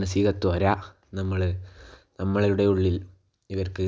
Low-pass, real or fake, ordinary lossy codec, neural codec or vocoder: none; real; none; none